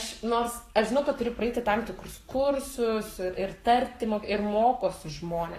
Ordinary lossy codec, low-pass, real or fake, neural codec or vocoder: AAC, 48 kbps; 14.4 kHz; fake; codec, 44.1 kHz, 7.8 kbps, Pupu-Codec